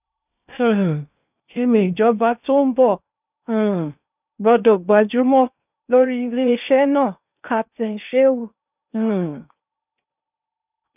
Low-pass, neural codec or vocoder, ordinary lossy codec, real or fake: 3.6 kHz; codec, 16 kHz in and 24 kHz out, 0.8 kbps, FocalCodec, streaming, 65536 codes; none; fake